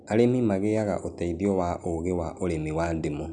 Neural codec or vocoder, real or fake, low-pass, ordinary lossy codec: none; real; 9.9 kHz; none